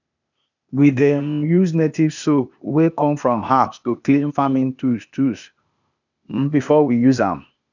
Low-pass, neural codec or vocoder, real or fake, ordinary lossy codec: 7.2 kHz; codec, 16 kHz, 0.8 kbps, ZipCodec; fake; none